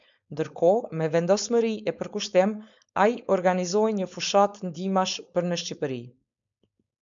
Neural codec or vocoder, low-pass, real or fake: codec, 16 kHz, 4.8 kbps, FACodec; 7.2 kHz; fake